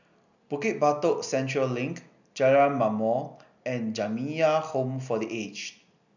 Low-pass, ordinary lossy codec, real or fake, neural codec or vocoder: 7.2 kHz; none; real; none